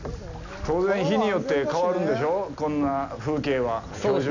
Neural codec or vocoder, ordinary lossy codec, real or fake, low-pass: none; none; real; 7.2 kHz